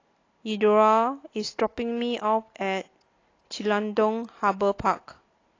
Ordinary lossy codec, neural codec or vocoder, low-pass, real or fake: AAC, 32 kbps; none; 7.2 kHz; real